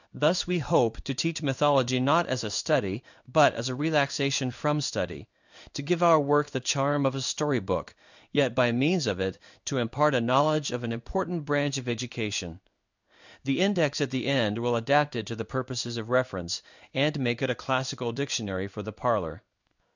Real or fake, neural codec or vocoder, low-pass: fake; codec, 16 kHz in and 24 kHz out, 1 kbps, XY-Tokenizer; 7.2 kHz